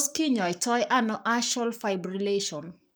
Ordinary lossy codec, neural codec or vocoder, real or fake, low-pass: none; codec, 44.1 kHz, 7.8 kbps, Pupu-Codec; fake; none